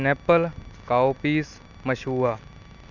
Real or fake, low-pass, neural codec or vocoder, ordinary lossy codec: real; 7.2 kHz; none; none